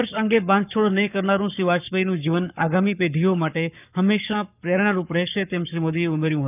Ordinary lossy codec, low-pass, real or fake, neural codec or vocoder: none; 3.6 kHz; fake; codec, 44.1 kHz, 7.8 kbps, Pupu-Codec